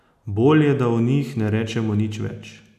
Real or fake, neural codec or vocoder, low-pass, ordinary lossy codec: real; none; 14.4 kHz; none